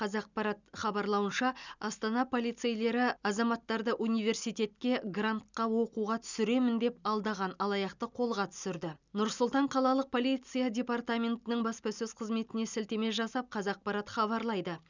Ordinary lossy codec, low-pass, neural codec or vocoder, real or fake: none; 7.2 kHz; none; real